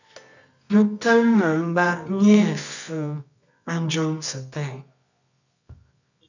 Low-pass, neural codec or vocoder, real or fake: 7.2 kHz; codec, 24 kHz, 0.9 kbps, WavTokenizer, medium music audio release; fake